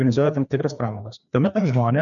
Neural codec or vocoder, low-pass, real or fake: codec, 16 kHz, 2 kbps, FreqCodec, larger model; 7.2 kHz; fake